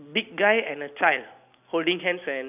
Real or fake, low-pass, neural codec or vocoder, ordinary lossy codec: real; 3.6 kHz; none; none